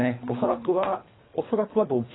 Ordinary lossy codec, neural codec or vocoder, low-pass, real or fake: AAC, 16 kbps; codec, 24 kHz, 3 kbps, HILCodec; 7.2 kHz; fake